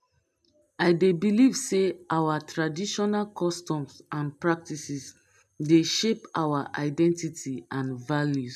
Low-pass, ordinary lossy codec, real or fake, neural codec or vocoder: 14.4 kHz; none; real; none